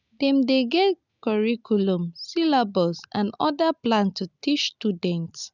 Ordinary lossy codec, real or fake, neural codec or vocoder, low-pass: none; real; none; 7.2 kHz